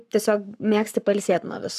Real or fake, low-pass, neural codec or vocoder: fake; 14.4 kHz; codec, 44.1 kHz, 7.8 kbps, Pupu-Codec